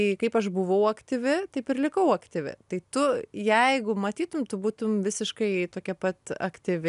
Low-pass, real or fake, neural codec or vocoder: 10.8 kHz; real; none